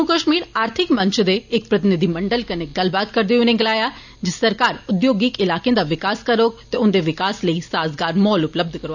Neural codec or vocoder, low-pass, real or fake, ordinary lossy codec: none; 7.2 kHz; real; none